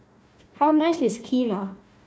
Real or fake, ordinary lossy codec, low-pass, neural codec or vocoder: fake; none; none; codec, 16 kHz, 1 kbps, FunCodec, trained on Chinese and English, 50 frames a second